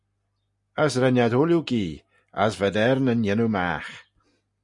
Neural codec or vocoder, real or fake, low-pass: none; real; 10.8 kHz